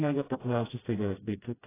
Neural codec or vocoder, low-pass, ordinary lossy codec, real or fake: codec, 16 kHz, 0.5 kbps, FreqCodec, smaller model; 3.6 kHz; AAC, 16 kbps; fake